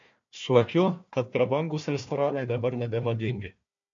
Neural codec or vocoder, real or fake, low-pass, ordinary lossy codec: codec, 16 kHz, 1 kbps, FunCodec, trained on Chinese and English, 50 frames a second; fake; 7.2 kHz; MP3, 48 kbps